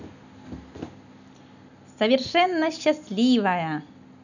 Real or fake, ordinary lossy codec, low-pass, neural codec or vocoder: real; none; 7.2 kHz; none